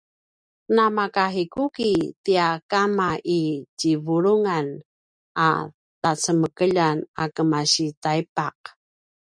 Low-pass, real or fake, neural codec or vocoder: 9.9 kHz; real; none